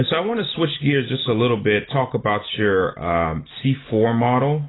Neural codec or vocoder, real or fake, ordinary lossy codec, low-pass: none; real; AAC, 16 kbps; 7.2 kHz